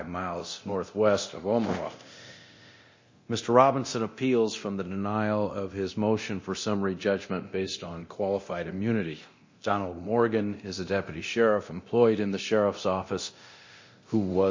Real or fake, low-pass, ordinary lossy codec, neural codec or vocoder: fake; 7.2 kHz; MP3, 32 kbps; codec, 24 kHz, 0.9 kbps, DualCodec